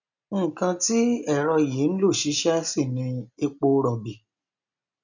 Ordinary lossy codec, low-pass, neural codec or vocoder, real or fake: none; 7.2 kHz; none; real